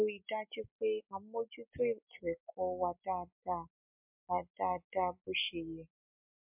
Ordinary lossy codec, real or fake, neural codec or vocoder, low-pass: MP3, 32 kbps; real; none; 3.6 kHz